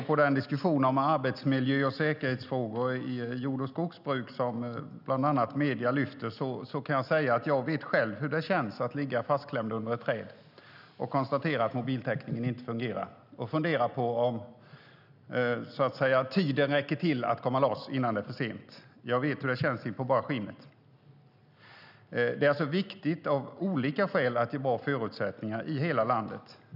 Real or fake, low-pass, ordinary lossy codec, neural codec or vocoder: real; 5.4 kHz; none; none